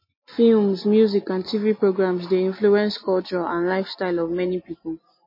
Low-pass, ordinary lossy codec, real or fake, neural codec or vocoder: 5.4 kHz; MP3, 24 kbps; real; none